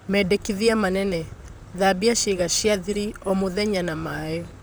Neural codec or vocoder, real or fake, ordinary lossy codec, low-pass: vocoder, 44.1 kHz, 128 mel bands, Pupu-Vocoder; fake; none; none